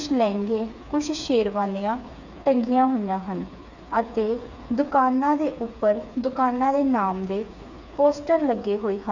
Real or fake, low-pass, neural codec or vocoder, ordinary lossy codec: fake; 7.2 kHz; codec, 16 kHz, 4 kbps, FreqCodec, smaller model; none